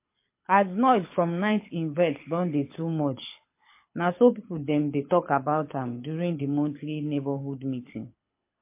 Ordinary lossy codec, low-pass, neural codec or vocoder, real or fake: MP3, 24 kbps; 3.6 kHz; codec, 24 kHz, 6 kbps, HILCodec; fake